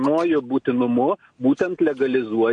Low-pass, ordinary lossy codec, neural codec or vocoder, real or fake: 9.9 kHz; AAC, 64 kbps; none; real